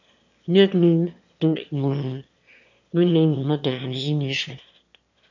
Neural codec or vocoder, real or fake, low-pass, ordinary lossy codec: autoencoder, 22.05 kHz, a latent of 192 numbers a frame, VITS, trained on one speaker; fake; 7.2 kHz; MP3, 48 kbps